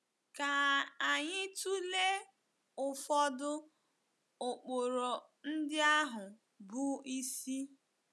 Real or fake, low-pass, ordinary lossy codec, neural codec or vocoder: real; none; none; none